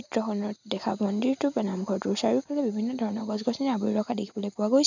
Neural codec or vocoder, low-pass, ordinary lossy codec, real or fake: none; 7.2 kHz; none; real